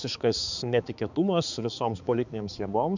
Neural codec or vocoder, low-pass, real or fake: codec, 16 kHz, 4 kbps, X-Codec, HuBERT features, trained on balanced general audio; 7.2 kHz; fake